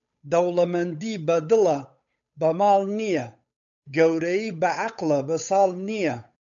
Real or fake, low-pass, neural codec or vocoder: fake; 7.2 kHz; codec, 16 kHz, 8 kbps, FunCodec, trained on Chinese and English, 25 frames a second